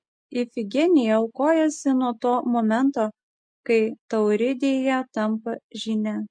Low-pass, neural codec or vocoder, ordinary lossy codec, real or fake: 9.9 kHz; none; MP3, 48 kbps; real